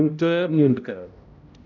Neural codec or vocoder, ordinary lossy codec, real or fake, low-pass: codec, 16 kHz, 0.5 kbps, X-Codec, HuBERT features, trained on balanced general audio; none; fake; 7.2 kHz